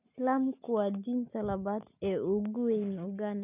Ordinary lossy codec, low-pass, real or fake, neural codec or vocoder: none; 3.6 kHz; real; none